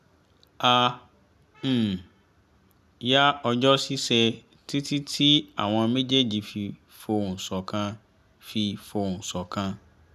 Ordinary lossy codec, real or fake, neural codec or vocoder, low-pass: none; real; none; 14.4 kHz